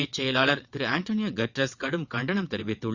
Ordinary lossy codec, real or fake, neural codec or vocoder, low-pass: Opus, 64 kbps; fake; vocoder, 22.05 kHz, 80 mel bands, WaveNeXt; 7.2 kHz